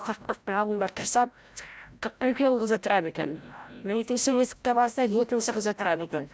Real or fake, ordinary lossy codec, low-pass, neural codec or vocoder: fake; none; none; codec, 16 kHz, 0.5 kbps, FreqCodec, larger model